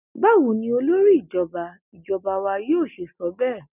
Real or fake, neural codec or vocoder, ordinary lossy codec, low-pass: real; none; none; 3.6 kHz